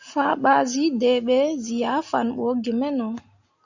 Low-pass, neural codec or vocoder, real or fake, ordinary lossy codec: 7.2 kHz; none; real; Opus, 64 kbps